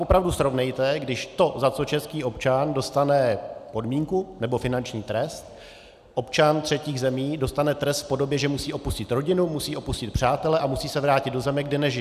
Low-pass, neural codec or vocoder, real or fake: 14.4 kHz; none; real